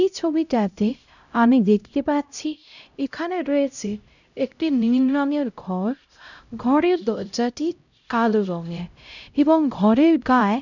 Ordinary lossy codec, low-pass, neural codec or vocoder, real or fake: none; 7.2 kHz; codec, 16 kHz, 0.5 kbps, X-Codec, HuBERT features, trained on LibriSpeech; fake